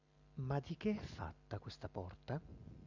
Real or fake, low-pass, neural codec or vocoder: real; 7.2 kHz; none